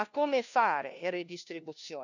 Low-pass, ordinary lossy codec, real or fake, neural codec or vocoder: 7.2 kHz; none; fake; codec, 16 kHz, 1 kbps, FunCodec, trained on LibriTTS, 50 frames a second